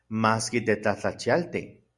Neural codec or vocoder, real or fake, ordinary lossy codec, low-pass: none; real; Opus, 64 kbps; 10.8 kHz